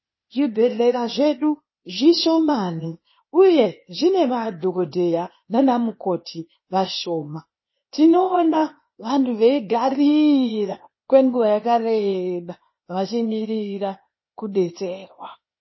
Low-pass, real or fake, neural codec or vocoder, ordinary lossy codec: 7.2 kHz; fake; codec, 16 kHz, 0.8 kbps, ZipCodec; MP3, 24 kbps